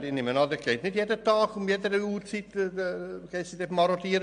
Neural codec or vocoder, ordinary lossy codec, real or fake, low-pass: none; MP3, 64 kbps; real; 9.9 kHz